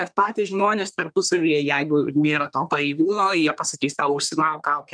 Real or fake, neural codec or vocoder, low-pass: fake; codec, 24 kHz, 1 kbps, SNAC; 9.9 kHz